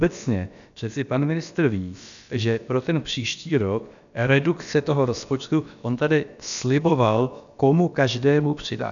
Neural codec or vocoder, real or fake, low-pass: codec, 16 kHz, about 1 kbps, DyCAST, with the encoder's durations; fake; 7.2 kHz